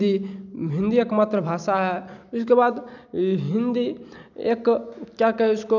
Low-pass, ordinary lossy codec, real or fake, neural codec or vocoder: 7.2 kHz; none; real; none